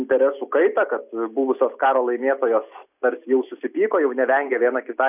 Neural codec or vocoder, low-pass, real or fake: none; 3.6 kHz; real